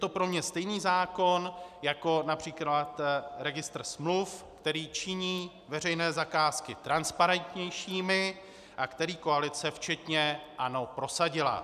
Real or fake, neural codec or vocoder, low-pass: real; none; 14.4 kHz